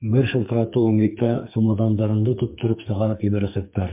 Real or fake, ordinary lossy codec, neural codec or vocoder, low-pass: fake; MP3, 32 kbps; codec, 44.1 kHz, 2.6 kbps, SNAC; 3.6 kHz